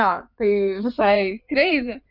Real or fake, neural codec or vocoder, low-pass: fake; codec, 16 kHz in and 24 kHz out, 2.2 kbps, FireRedTTS-2 codec; 5.4 kHz